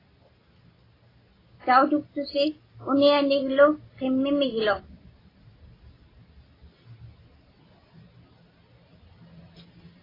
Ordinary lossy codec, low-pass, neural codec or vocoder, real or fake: AAC, 24 kbps; 5.4 kHz; none; real